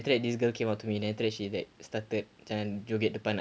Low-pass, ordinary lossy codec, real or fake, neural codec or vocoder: none; none; real; none